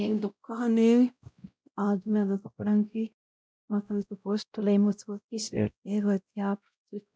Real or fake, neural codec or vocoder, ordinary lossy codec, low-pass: fake; codec, 16 kHz, 0.5 kbps, X-Codec, WavLM features, trained on Multilingual LibriSpeech; none; none